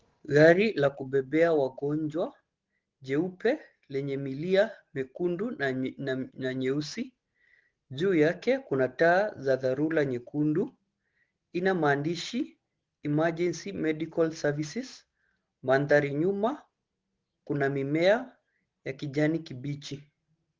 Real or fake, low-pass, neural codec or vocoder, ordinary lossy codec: real; 7.2 kHz; none; Opus, 16 kbps